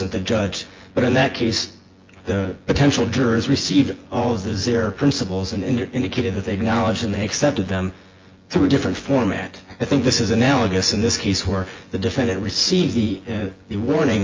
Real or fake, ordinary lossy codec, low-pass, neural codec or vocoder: fake; Opus, 32 kbps; 7.2 kHz; vocoder, 24 kHz, 100 mel bands, Vocos